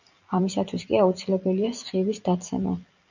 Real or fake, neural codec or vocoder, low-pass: fake; vocoder, 44.1 kHz, 128 mel bands every 256 samples, BigVGAN v2; 7.2 kHz